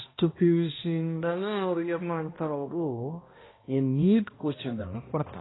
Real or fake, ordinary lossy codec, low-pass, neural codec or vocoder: fake; AAC, 16 kbps; 7.2 kHz; codec, 16 kHz, 1 kbps, X-Codec, HuBERT features, trained on balanced general audio